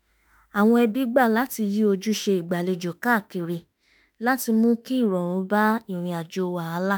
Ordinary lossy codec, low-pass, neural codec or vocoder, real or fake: none; none; autoencoder, 48 kHz, 32 numbers a frame, DAC-VAE, trained on Japanese speech; fake